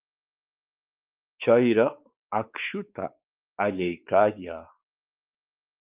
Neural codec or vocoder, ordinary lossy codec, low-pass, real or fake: codec, 16 kHz, 4 kbps, X-Codec, WavLM features, trained on Multilingual LibriSpeech; Opus, 24 kbps; 3.6 kHz; fake